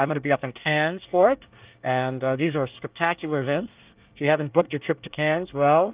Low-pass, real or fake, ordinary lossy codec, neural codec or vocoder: 3.6 kHz; fake; Opus, 32 kbps; codec, 24 kHz, 1 kbps, SNAC